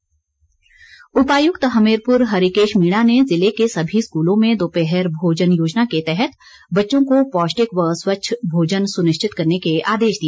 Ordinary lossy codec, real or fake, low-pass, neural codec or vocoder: none; real; none; none